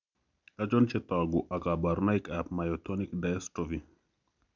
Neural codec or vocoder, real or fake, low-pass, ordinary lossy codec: none; real; 7.2 kHz; none